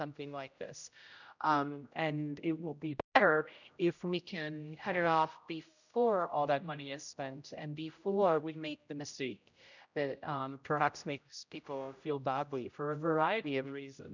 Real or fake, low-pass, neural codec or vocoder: fake; 7.2 kHz; codec, 16 kHz, 0.5 kbps, X-Codec, HuBERT features, trained on general audio